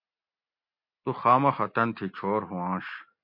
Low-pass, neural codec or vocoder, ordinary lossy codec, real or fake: 5.4 kHz; none; MP3, 32 kbps; real